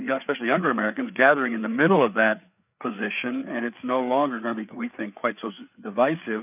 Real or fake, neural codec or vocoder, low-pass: fake; codec, 16 kHz, 4 kbps, FreqCodec, larger model; 3.6 kHz